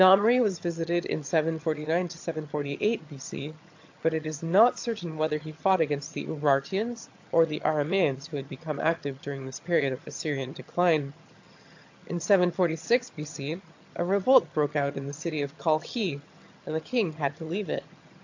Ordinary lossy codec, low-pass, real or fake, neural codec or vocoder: AAC, 48 kbps; 7.2 kHz; fake; vocoder, 22.05 kHz, 80 mel bands, HiFi-GAN